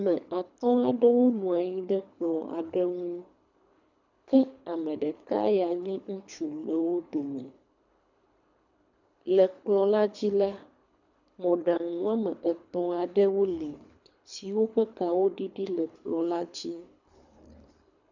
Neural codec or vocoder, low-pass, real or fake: codec, 24 kHz, 3 kbps, HILCodec; 7.2 kHz; fake